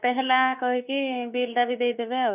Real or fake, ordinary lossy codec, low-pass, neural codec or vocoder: fake; none; 3.6 kHz; autoencoder, 48 kHz, 32 numbers a frame, DAC-VAE, trained on Japanese speech